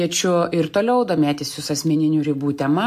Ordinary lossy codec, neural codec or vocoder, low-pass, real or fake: MP3, 64 kbps; none; 14.4 kHz; real